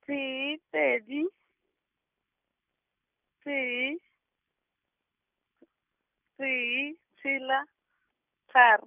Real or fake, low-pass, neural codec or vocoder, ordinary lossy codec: real; 3.6 kHz; none; none